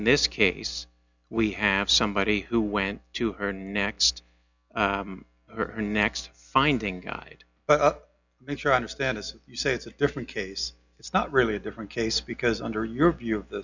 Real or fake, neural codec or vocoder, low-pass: real; none; 7.2 kHz